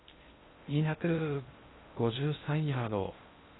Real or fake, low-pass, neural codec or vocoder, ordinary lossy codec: fake; 7.2 kHz; codec, 16 kHz in and 24 kHz out, 0.6 kbps, FocalCodec, streaming, 2048 codes; AAC, 16 kbps